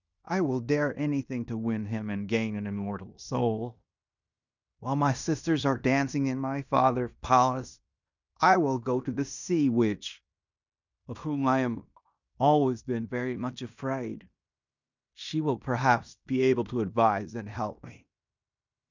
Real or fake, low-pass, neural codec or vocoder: fake; 7.2 kHz; codec, 16 kHz in and 24 kHz out, 0.9 kbps, LongCat-Audio-Codec, fine tuned four codebook decoder